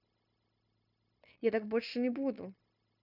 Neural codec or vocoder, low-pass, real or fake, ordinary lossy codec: codec, 16 kHz, 0.9 kbps, LongCat-Audio-Codec; 5.4 kHz; fake; none